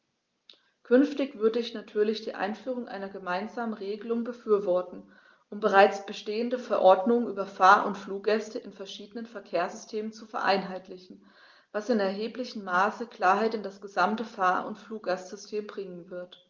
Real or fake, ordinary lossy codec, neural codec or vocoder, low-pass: real; Opus, 32 kbps; none; 7.2 kHz